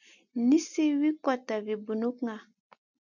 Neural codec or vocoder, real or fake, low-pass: none; real; 7.2 kHz